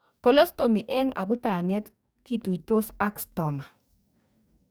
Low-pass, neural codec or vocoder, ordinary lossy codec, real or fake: none; codec, 44.1 kHz, 2.6 kbps, DAC; none; fake